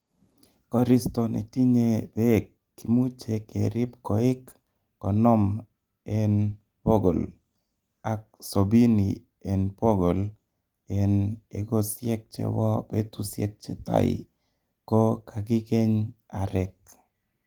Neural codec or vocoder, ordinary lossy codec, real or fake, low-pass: none; Opus, 24 kbps; real; 19.8 kHz